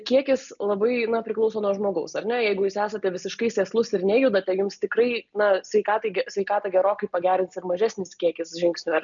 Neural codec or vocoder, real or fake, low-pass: none; real; 7.2 kHz